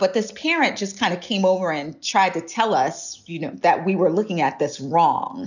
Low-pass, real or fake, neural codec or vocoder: 7.2 kHz; real; none